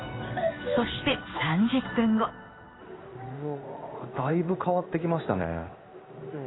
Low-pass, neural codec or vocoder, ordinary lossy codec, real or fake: 7.2 kHz; codec, 16 kHz in and 24 kHz out, 1 kbps, XY-Tokenizer; AAC, 16 kbps; fake